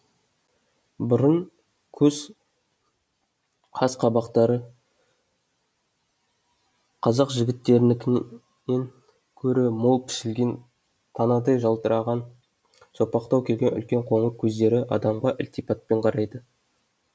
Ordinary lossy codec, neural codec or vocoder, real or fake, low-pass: none; none; real; none